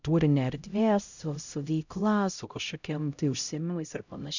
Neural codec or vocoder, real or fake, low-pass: codec, 16 kHz, 0.5 kbps, X-Codec, HuBERT features, trained on LibriSpeech; fake; 7.2 kHz